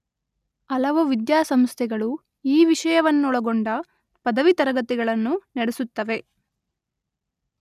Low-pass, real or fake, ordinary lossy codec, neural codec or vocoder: 14.4 kHz; real; none; none